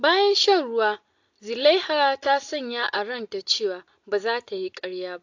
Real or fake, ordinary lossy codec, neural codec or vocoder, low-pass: real; AAC, 48 kbps; none; 7.2 kHz